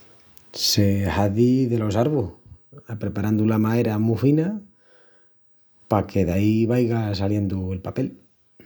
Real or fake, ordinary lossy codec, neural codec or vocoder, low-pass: real; none; none; none